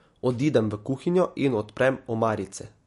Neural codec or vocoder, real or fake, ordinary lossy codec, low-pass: none; real; MP3, 48 kbps; 14.4 kHz